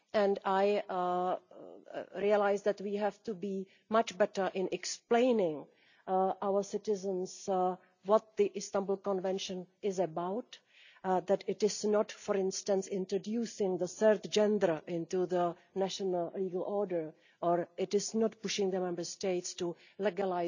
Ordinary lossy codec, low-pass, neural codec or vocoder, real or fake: none; 7.2 kHz; none; real